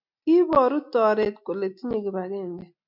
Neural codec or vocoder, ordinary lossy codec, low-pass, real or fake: none; MP3, 48 kbps; 5.4 kHz; real